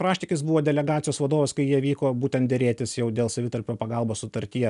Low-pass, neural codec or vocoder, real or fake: 10.8 kHz; none; real